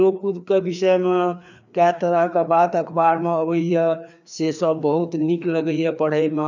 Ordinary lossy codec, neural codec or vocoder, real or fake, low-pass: none; codec, 16 kHz, 2 kbps, FreqCodec, larger model; fake; 7.2 kHz